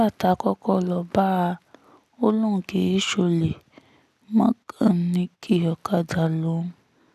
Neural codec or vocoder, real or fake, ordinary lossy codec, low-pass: none; real; none; 14.4 kHz